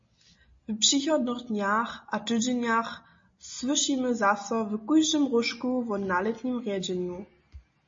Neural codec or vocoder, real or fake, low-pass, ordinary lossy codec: none; real; 7.2 kHz; MP3, 32 kbps